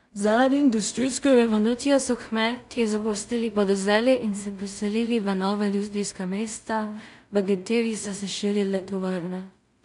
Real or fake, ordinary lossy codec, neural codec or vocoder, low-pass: fake; none; codec, 16 kHz in and 24 kHz out, 0.4 kbps, LongCat-Audio-Codec, two codebook decoder; 10.8 kHz